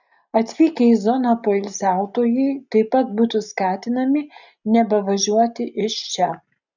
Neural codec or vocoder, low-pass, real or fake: none; 7.2 kHz; real